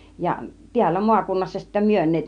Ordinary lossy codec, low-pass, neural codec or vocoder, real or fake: none; 9.9 kHz; none; real